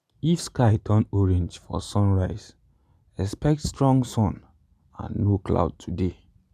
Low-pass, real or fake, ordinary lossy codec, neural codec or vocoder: 14.4 kHz; real; none; none